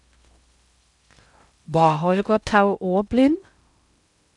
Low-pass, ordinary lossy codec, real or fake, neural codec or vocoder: 10.8 kHz; none; fake; codec, 16 kHz in and 24 kHz out, 0.6 kbps, FocalCodec, streaming, 4096 codes